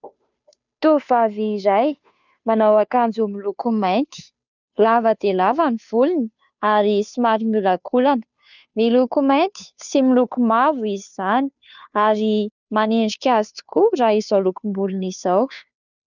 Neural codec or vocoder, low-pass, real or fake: codec, 16 kHz, 2 kbps, FunCodec, trained on Chinese and English, 25 frames a second; 7.2 kHz; fake